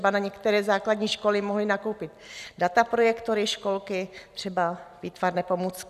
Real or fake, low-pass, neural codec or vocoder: real; 14.4 kHz; none